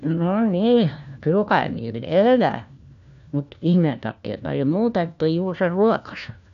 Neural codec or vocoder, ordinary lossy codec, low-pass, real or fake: codec, 16 kHz, 1 kbps, FunCodec, trained on LibriTTS, 50 frames a second; none; 7.2 kHz; fake